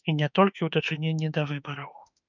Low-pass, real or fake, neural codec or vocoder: 7.2 kHz; fake; autoencoder, 48 kHz, 32 numbers a frame, DAC-VAE, trained on Japanese speech